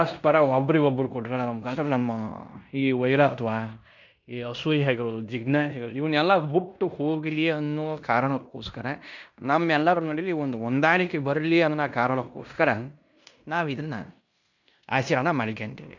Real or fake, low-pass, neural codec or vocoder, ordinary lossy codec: fake; 7.2 kHz; codec, 16 kHz in and 24 kHz out, 0.9 kbps, LongCat-Audio-Codec, fine tuned four codebook decoder; none